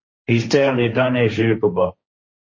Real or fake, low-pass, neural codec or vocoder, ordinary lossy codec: fake; 7.2 kHz; codec, 16 kHz, 1.1 kbps, Voila-Tokenizer; MP3, 32 kbps